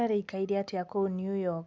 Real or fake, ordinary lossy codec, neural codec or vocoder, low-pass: real; none; none; none